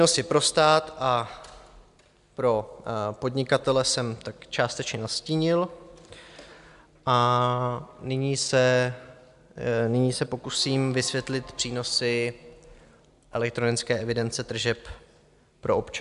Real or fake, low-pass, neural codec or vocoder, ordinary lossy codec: real; 10.8 kHz; none; AAC, 96 kbps